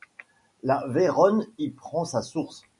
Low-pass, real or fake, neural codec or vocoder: 10.8 kHz; real; none